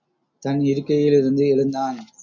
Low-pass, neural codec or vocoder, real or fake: 7.2 kHz; none; real